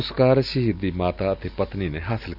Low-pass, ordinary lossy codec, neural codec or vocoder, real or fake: 5.4 kHz; none; none; real